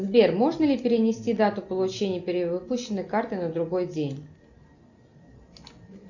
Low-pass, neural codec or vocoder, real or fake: 7.2 kHz; none; real